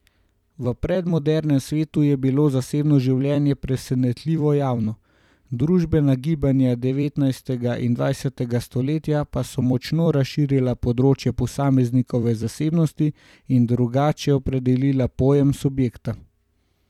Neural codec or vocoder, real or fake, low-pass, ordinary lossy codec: vocoder, 44.1 kHz, 128 mel bands every 256 samples, BigVGAN v2; fake; 19.8 kHz; none